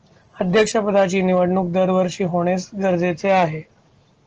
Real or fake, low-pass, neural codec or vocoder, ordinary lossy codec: real; 7.2 kHz; none; Opus, 16 kbps